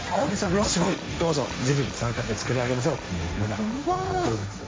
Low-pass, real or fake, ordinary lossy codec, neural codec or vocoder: none; fake; none; codec, 16 kHz, 1.1 kbps, Voila-Tokenizer